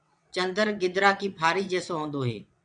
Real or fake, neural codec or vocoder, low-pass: fake; vocoder, 22.05 kHz, 80 mel bands, WaveNeXt; 9.9 kHz